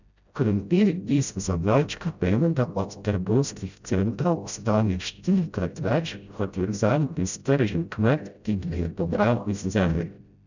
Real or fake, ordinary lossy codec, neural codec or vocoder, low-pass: fake; none; codec, 16 kHz, 0.5 kbps, FreqCodec, smaller model; 7.2 kHz